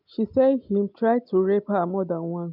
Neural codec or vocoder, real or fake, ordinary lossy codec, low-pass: none; real; none; 5.4 kHz